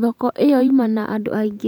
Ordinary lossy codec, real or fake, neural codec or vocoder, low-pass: none; fake; vocoder, 44.1 kHz, 128 mel bands every 512 samples, BigVGAN v2; 19.8 kHz